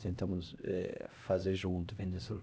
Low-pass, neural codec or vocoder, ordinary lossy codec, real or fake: none; codec, 16 kHz, 1 kbps, X-Codec, HuBERT features, trained on LibriSpeech; none; fake